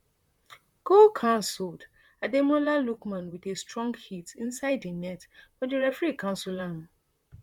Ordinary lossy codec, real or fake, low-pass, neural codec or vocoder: MP3, 96 kbps; fake; 19.8 kHz; vocoder, 44.1 kHz, 128 mel bands, Pupu-Vocoder